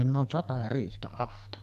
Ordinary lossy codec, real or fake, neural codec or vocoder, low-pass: none; fake; codec, 44.1 kHz, 2.6 kbps, SNAC; 14.4 kHz